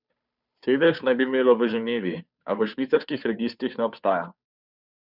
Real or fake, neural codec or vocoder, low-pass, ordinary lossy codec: fake; codec, 16 kHz, 2 kbps, FunCodec, trained on Chinese and English, 25 frames a second; 5.4 kHz; none